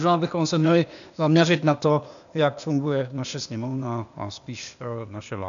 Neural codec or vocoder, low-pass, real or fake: codec, 16 kHz, 0.8 kbps, ZipCodec; 7.2 kHz; fake